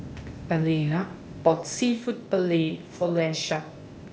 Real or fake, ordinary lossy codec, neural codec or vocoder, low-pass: fake; none; codec, 16 kHz, 0.8 kbps, ZipCodec; none